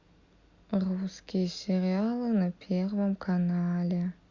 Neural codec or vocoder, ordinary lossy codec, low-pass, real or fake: none; Opus, 64 kbps; 7.2 kHz; real